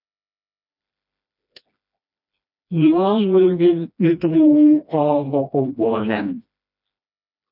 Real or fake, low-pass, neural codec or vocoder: fake; 5.4 kHz; codec, 16 kHz, 1 kbps, FreqCodec, smaller model